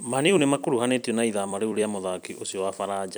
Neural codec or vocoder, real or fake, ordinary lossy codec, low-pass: vocoder, 44.1 kHz, 128 mel bands every 256 samples, BigVGAN v2; fake; none; none